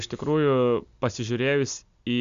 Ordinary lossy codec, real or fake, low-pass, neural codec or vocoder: Opus, 64 kbps; real; 7.2 kHz; none